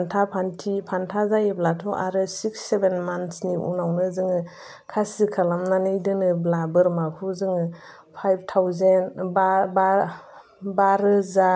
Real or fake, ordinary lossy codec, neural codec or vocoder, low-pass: real; none; none; none